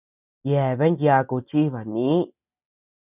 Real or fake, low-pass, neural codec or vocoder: real; 3.6 kHz; none